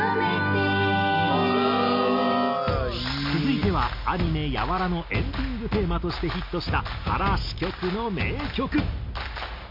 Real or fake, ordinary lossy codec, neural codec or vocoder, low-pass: real; MP3, 32 kbps; none; 5.4 kHz